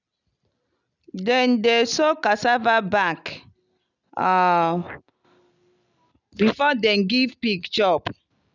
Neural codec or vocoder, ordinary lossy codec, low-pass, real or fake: none; none; 7.2 kHz; real